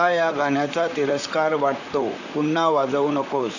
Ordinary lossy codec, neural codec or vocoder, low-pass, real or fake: none; vocoder, 44.1 kHz, 128 mel bands, Pupu-Vocoder; 7.2 kHz; fake